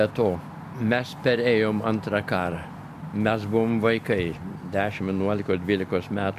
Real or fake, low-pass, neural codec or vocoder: real; 14.4 kHz; none